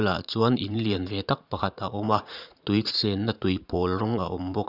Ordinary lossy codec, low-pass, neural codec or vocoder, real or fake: Opus, 64 kbps; 5.4 kHz; vocoder, 44.1 kHz, 80 mel bands, Vocos; fake